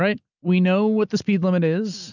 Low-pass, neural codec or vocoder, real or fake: 7.2 kHz; none; real